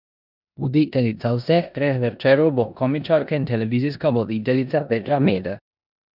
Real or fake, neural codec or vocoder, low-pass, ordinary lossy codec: fake; codec, 16 kHz in and 24 kHz out, 0.9 kbps, LongCat-Audio-Codec, four codebook decoder; 5.4 kHz; none